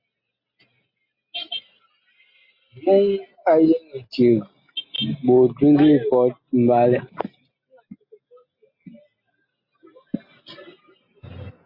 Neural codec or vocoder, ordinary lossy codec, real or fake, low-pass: none; MP3, 32 kbps; real; 5.4 kHz